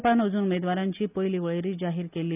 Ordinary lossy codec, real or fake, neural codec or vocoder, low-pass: none; real; none; 3.6 kHz